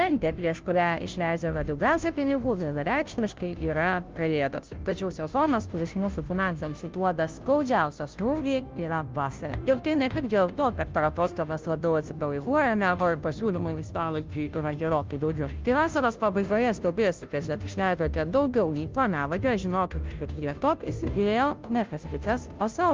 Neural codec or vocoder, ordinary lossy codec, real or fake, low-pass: codec, 16 kHz, 0.5 kbps, FunCodec, trained on Chinese and English, 25 frames a second; Opus, 24 kbps; fake; 7.2 kHz